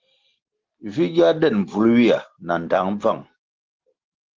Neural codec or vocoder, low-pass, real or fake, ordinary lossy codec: none; 7.2 kHz; real; Opus, 16 kbps